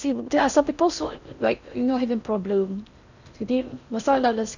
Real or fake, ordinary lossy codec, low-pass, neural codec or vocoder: fake; none; 7.2 kHz; codec, 16 kHz in and 24 kHz out, 0.6 kbps, FocalCodec, streaming, 4096 codes